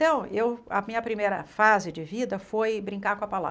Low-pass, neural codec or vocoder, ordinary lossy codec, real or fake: none; none; none; real